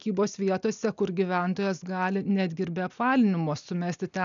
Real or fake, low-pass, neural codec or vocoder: real; 7.2 kHz; none